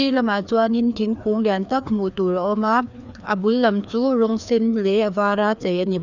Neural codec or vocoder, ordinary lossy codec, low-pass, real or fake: codec, 16 kHz, 2 kbps, FreqCodec, larger model; none; 7.2 kHz; fake